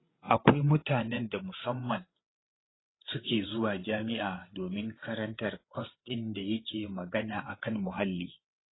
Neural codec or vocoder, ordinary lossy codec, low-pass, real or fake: codec, 16 kHz, 8 kbps, FreqCodec, larger model; AAC, 16 kbps; 7.2 kHz; fake